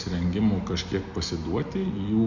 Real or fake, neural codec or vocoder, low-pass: real; none; 7.2 kHz